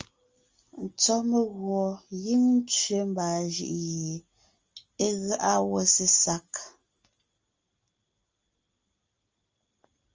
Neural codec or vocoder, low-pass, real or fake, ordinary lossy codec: none; 7.2 kHz; real; Opus, 32 kbps